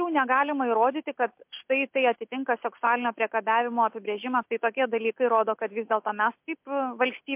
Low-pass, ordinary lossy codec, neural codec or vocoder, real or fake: 3.6 kHz; MP3, 32 kbps; none; real